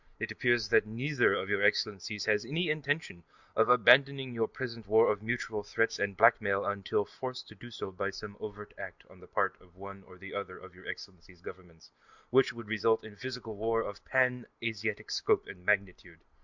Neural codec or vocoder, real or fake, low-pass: none; real; 7.2 kHz